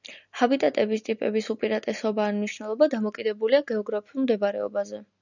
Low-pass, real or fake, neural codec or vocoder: 7.2 kHz; real; none